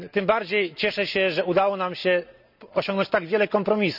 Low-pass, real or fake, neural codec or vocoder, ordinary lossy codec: 5.4 kHz; real; none; none